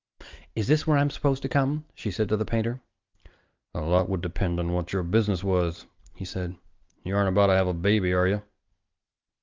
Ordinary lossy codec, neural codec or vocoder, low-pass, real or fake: Opus, 32 kbps; none; 7.2 kHz; real